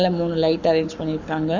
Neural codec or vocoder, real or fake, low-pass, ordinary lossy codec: codec, 44.1 kHz, 7.8 kbps, Pupu-Codec; fake; 7.2 kHz; none